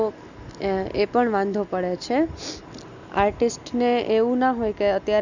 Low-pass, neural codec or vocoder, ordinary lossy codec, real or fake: 7.2 kHz; none; none; real